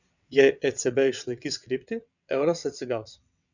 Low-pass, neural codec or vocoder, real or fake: 7.2 kHz; vocoder, 22.05 kHz, 80 mel bands, WaveNeXt; fake